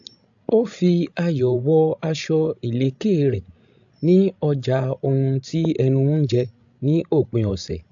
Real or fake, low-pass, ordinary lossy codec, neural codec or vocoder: fake; 7.2 kHz; AAC, 64 kbps; codec, 16 kHz, 16 kbps, FreqCodec, larger model